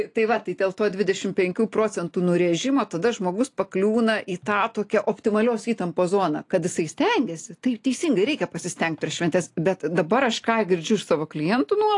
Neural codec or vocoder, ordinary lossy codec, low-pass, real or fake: none; AAC, 48 kbps; 10.8 kHz; real